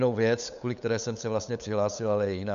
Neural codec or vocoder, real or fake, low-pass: codec, 16 kHz, 8 kbps, FunCodec, trained on LibriTTS, 25 frames a second; fake; 7.2 kHz